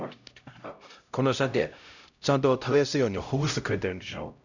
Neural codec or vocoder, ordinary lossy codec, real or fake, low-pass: codec, 16 kHz, 0.5 kbps, X-Codec, HuBERT features, trained on LibriSpeech; none; fake; 7.2 kHz